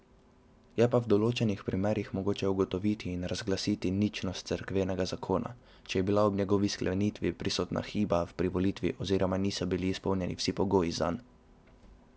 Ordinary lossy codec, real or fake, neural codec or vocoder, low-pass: none; real; none; none